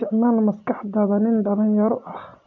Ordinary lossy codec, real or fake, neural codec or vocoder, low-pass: none; real; none; 7.2 kHz